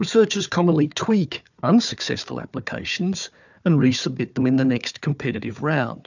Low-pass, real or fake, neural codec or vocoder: 7.2 kHz; fake; codec, 16 kHz, 4 kbps, FunCodec, trained on Chinese and English, 50 frames a second